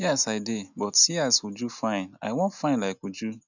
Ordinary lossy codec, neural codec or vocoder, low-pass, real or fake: none; none; 7.2 kHz; real